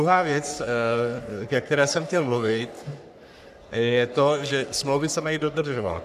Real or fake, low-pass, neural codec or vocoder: fake; 14.4 kHz; codec, 44.1 kHz, 3.4 kbps, Pupu-Codec